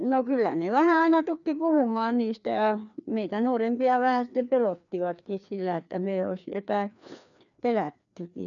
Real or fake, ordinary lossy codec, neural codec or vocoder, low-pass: fake; none; codec, 16 kHz, 2 kbps, FreqCodec, larger model; 7.2 kHz